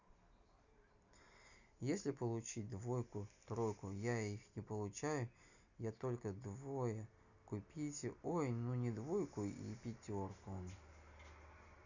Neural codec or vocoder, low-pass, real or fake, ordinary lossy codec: none; 7.2 kHz; real; none